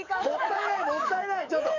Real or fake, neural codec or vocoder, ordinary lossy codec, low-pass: fake; codec, 44.1 kHz, 7.8 kbps, DAC; none; 7.2 kHz